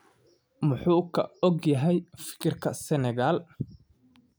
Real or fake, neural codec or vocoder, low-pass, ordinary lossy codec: real; none; none; none